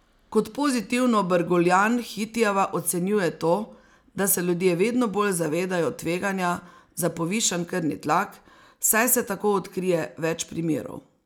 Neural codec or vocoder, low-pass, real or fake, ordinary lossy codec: none; none; real; none